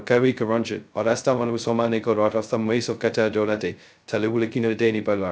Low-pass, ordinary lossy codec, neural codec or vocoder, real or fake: none; none; codec, 16 kHz, 0.2 kbps, FocalCodec; fake